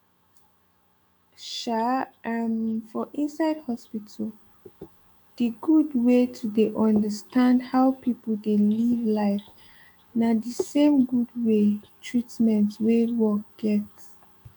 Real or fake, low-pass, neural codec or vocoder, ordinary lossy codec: fake; 19.8 kHz; autoencoder, 48 kHz, 128 numbers a frame, DAC-VAE, trained on Japanese speech; none